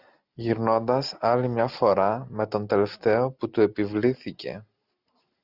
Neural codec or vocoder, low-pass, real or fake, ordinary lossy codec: none; 5.4 kHz; real; Opus, 64 kbps